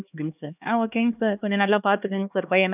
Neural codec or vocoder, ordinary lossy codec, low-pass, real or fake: codec, 16 kHz, 2 kbps, X-Codec, HuBERT features, trained on LibriSpeech; none; 3.6 kHz; fake